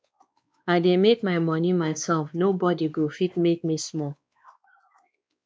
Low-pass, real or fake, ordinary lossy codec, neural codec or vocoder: none; fake; none; codec, 16 kHz, 2 kbps, X-Codec, WavLM features, trained on Multilingual LibriSpeech